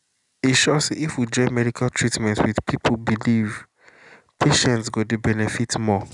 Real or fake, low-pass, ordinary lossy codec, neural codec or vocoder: real; 10.8 kHz; none; none